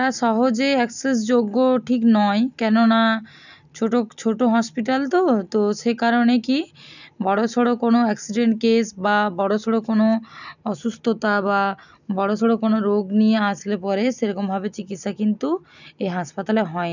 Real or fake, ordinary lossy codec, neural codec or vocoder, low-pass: real; none; none; 7.2 kHz